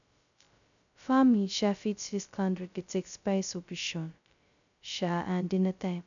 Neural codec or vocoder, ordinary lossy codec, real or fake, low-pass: codec, 16 kHz, 0.2 kbps, FocalCodec; none; fake; 7.2 kHz